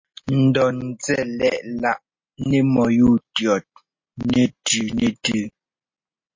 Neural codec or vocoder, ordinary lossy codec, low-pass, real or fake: none; MP3, 32 kbps; 7.2 kHz; real